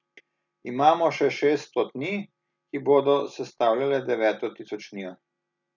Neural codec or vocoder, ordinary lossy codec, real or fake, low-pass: none; none; real; 7.2 kHz